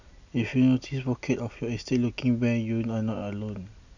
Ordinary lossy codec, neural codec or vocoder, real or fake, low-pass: none; none; real; 7.2 kHz